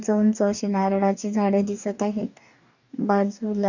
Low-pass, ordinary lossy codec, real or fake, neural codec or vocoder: 7.2 kHz; none; fake; codec, 44.1 kHz, 2.6 kbps, DAC